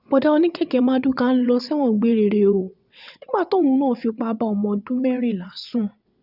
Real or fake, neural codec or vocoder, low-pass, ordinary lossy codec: fake; vocoder, 22.05 kHz, 80 mel bands, WaveNeXt; 5.4 kHz; none